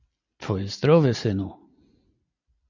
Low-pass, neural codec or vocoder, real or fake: 7.2 kHz; none; real